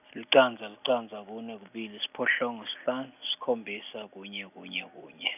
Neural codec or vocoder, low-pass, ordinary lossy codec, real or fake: none; 3.6 kHz; none; real